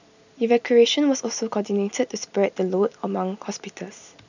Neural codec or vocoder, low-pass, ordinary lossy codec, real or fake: none; 7.2 kHz; none; real